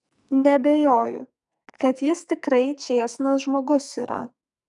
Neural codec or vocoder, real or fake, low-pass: codec, 44.1 kHz, 2.6 kbps, SNAC; fake; 10.8 kHz